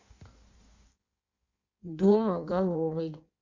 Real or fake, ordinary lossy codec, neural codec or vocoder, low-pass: fake; Opus, 64 kbps; codec, 16 kHz in and 24 kHz out, 1.1 kbps, FireRedTTS-2 codec; 7.2 kHz